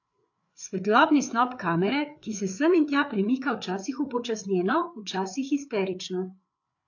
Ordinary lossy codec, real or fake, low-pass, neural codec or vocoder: AAC, 48 kbps; fake; 7.2 kHz; codec, 16 kHz, 4 kbps, FreqCodec, larger model